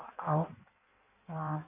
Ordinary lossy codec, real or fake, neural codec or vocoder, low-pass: none; fake; codec, 16 kHz in and 24 kHz out, 1.1 kbps, FireRedTTS-2 codec; 3.6 kHz